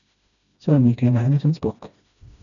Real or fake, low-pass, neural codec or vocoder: fake; 7.2 kHz; codec, 16 kHz, 1 kbps, FreqCodec, smaller model